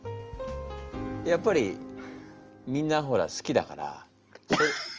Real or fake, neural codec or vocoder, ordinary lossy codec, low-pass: real; none; Opus, 24 kbps; 7.2 kHz